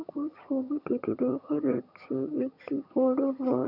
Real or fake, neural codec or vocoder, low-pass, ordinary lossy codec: fake; vocoder, 22.05 kHz, 80 mel bands, HiFi-GAN; 5.4 kHz; none